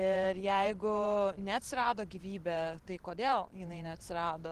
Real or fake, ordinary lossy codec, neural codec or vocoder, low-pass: fake; Opus, 16 kbps; vocoder, 44.1 kHz, 128 mel bands every 512 samples, BigVGAN v2; 14.4 kHz